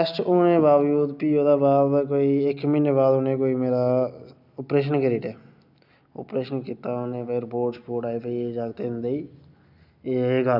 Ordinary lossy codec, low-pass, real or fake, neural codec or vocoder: none; 5.4 kHz; real; none